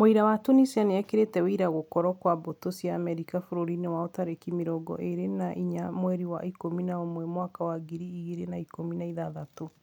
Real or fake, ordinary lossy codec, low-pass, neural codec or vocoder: real; none; 19.8 kHz; none